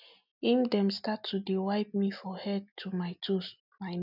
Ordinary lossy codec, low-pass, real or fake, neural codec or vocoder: none; 5.4 kHz; real; none